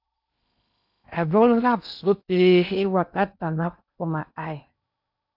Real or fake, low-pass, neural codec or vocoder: fake; 5.4 kHz; codec, 16 kHz in and 24 kHz out, 0.8 kbps, FocalCodec, streaming, 65536 codes